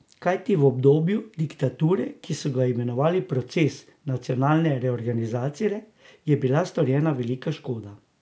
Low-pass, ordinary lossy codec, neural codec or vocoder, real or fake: none; none; none; real